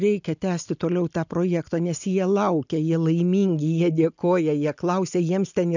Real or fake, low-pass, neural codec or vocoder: real; 7.2 kHz; none